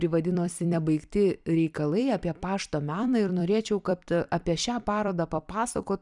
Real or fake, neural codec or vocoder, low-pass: fake; vocoder, 44.1 kHz, 128 mel bands every 256 samples, BigVGAN v2; 10.8 kHz